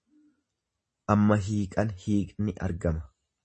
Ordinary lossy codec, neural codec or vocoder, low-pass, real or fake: MP3, 32 kbps; none; 10.8 kHz; real